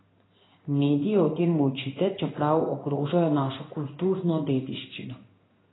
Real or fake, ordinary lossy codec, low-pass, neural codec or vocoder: fake; AAC, 16 kbps; 7.2 kHz; codec, 16 kHz in and 24 kHz out, 1 kbps, XY-Tokenizer